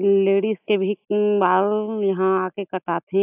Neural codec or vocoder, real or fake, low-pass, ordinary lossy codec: none; real; 3.6 kHz; AAC, 32 kbps